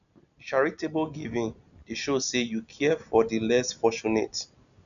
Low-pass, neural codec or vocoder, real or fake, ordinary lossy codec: 7.2 kHz; none; real; none